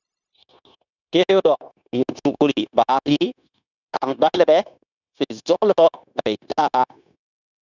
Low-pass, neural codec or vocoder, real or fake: 7.2 kHz; codec, 16 kHz, 0.9 kbps, LongCat-Audio-Codec; fake